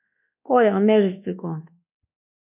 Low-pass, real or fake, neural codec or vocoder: 3.6 kHz; fake; codec, 24 kHz, 1.2 kbps, DualCodec